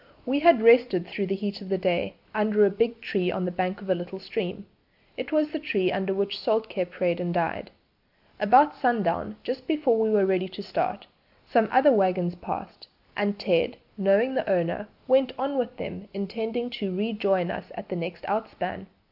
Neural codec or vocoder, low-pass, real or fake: none; 5.4 kHz; real